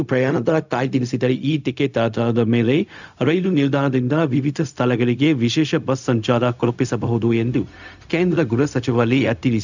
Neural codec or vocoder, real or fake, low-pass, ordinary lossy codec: codec, 16 kHz, 0.4 kbps, LongCat-Audio-Codec; fake; 7.2 kHz; none